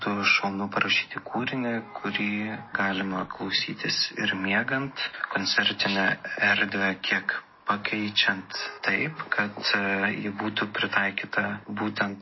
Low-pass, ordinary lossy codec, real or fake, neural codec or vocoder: 7.2 kHz; MP3, 24 kbps; real; none